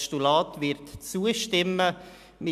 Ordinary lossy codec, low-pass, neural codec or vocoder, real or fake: none; 14.4 kHz; none; real